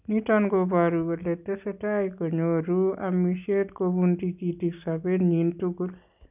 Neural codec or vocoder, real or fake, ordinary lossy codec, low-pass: none; real; none; 3.6 kHz